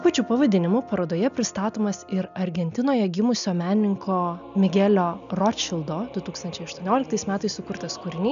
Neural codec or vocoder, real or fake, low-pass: none; real; 7.2 kHz